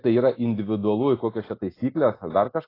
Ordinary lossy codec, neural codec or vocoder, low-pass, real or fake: AAC, 24 kbps; none; 5.4 kHz; real